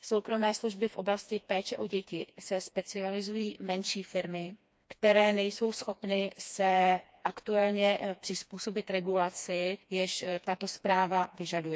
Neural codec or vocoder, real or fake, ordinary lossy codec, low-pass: codec, 16 kHz, 2 kbps, FreqCodec, smaller model; fake; none; none